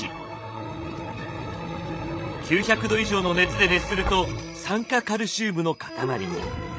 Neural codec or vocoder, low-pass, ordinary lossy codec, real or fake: codec, 16 kHz, 16 kbps, FreqCodec, larger model; none; none; fake